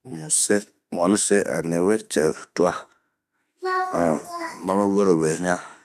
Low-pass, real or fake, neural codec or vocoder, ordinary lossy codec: 14.4 kHz; fake; autoencoder, 48 kHz, 32 numbers a frame, DAC-VAE, trained on Japanese speech; none